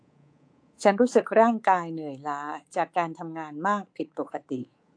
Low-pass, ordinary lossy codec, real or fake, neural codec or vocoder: 9.9 kHz; AAC, 48 kbps; fake; codec, 24 kHz, 3.1 kbps, DualCodec